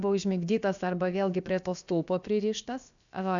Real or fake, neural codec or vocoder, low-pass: fake; codec, 16 kHz, about 1 kbps, DyCAST, with the encoder's durations; 7.2 kHz